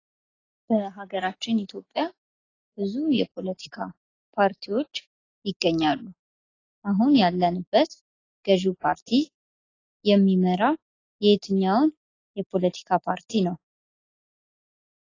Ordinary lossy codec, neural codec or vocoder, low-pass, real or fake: AAC, 32 kbps; none; 7.2 kHz; real